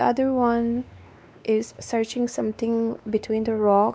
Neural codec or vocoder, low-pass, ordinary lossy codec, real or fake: codec, 16 kHz, 2 kbps, X-Codec, WavLM features, trained on Multilingual LibriSpeech; none; none; fake